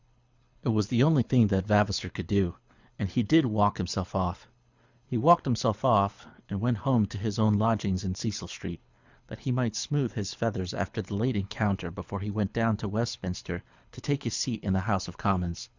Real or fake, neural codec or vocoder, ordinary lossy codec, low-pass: fake; codec, 24 kHz, 6 kbps, HILCodec; Opus, 64 kbps; 7.2 kHz